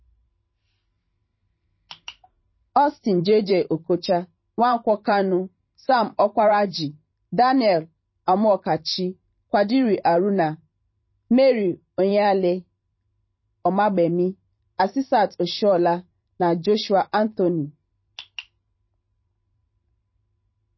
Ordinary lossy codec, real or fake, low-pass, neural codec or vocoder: MP3, 24 kbps; fake; 7.2 kHz; vocoder, 44.1 kHz, 128 mel bands every 512 samples, BigVGAN v2